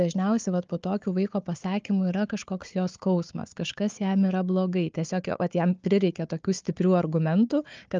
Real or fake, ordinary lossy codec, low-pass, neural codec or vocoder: fake; Opus, 24 kbps; 7.2 kHz; codec, 16 kHz, 4 kbps, FunCodec, trained on Chinese and English, 50 frames a second